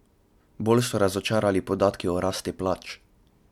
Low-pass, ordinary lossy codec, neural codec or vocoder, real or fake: 19.8 kHz; MP3, 96 kbps; none; real